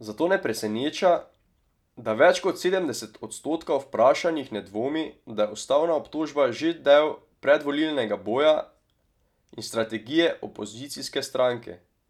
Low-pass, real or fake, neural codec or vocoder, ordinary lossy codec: 19.8 kHz; real; none; none